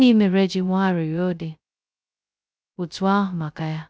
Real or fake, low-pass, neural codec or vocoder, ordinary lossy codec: fake; none; codec, 16 kHz, 0.2 kbps, FocalCodec; none